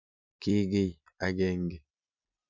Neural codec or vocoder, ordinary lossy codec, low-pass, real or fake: none; none; 7.2 kHz; real